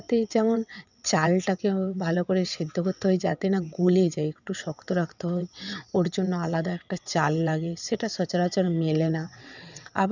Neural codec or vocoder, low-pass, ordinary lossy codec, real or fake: vocoder, 22.05 kHz, 80 mel bands, WaveNeXt; 7.2 kHz; none; fake